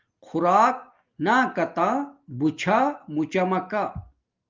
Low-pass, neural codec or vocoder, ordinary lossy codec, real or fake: 7.2 kHz; none; Opus, 32 kbps; real